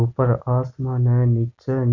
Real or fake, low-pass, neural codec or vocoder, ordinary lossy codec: real; 7.2 kHz; none; none